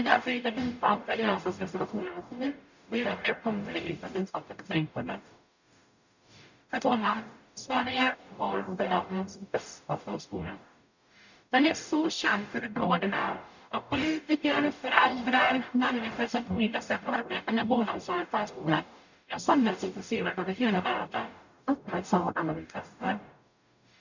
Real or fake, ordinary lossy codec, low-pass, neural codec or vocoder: fake; none; 7.2 kHz; codec, 44.1 kHz, 0.9 kbps, DAC